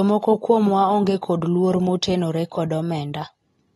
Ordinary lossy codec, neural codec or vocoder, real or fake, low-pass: AAC, 32 kbps; none; real; 19.8 kHz